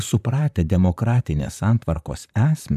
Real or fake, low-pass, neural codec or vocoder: fake; 14.4 kHz; vocoder, 44.1 kHz, 128 mel bands, Pupu-Vocoder